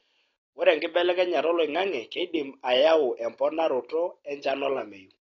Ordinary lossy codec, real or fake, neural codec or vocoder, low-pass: AAC, 32 kbps; real; none; 7.2 kHz